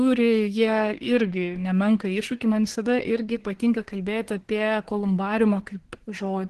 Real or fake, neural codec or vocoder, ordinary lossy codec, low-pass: fake; codec, 24 kHz, 1 kbps, SNAC; Opus, 16 kbps; 10.8 kHz